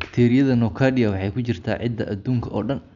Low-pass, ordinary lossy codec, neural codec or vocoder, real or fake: 7.2 kHz; none; none; real